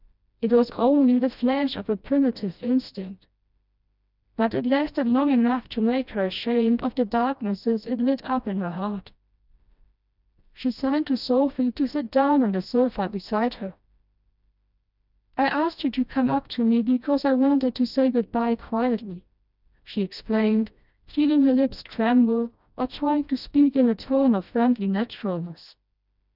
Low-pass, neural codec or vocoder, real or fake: 5.4 kHz; codec, 16 kHz, 1 kbps, FreqCodec, smaller model; fake